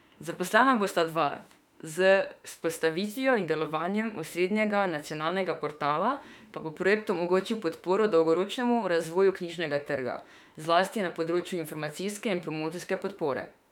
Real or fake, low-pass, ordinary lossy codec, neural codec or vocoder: fake; 19.8 kHz; none; autoencoder, 48 kHz, 32 numbers a frame, DAC-VAE, trained on Japanese speech